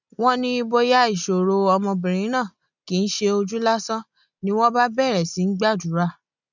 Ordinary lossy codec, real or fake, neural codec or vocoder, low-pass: none; real; none; 7.2 kHz